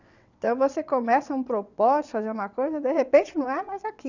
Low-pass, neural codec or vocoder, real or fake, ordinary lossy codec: 7.2 kHz; vocoder, 22.05 kHz, 80 mel bands, WaveNeXt; fake; none